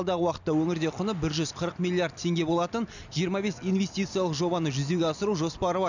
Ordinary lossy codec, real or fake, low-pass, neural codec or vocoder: none; real; 7.2 kHz; none